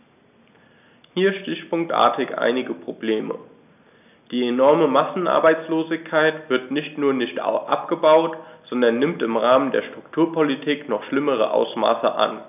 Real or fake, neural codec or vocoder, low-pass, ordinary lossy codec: real; none; 3.6 kHz; none